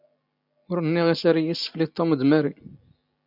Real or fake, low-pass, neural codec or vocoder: real; 5.4 kHz; none